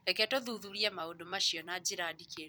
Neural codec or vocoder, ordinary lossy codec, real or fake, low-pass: vocoder, 44.1 kHz, 128 mel bands every 256 samples, BigVGAN v2; none; fake; none